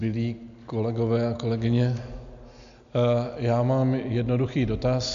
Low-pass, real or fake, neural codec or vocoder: 7.2 kHz; real; none